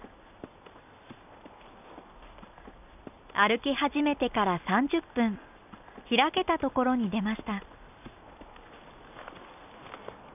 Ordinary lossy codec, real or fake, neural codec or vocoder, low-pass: none; real; none; 3.6 kHz